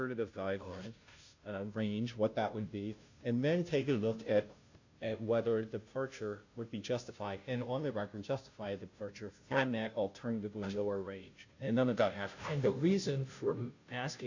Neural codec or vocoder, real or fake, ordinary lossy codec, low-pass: codec, 16 kHz, 0.5 kbps, FunCodec, trained on Chinese and English, 25 frames a second; fake; AAC, 48 kbps; 7.2 kHz